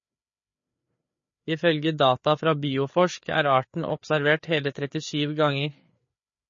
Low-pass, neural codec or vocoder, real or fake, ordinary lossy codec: 7.2 kHz; codec, 16 kHz, 4 kbps, FreqCodec, larger model; fake; MP3, 32 kbps